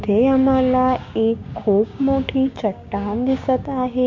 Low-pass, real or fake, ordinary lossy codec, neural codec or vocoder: 7.2 kHz; fake; MP3, 48 kbps; codec, 16 kHz, 6 kbps, DAC